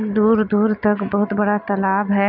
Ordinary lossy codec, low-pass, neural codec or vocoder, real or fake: none; 5.4 kHz; none; real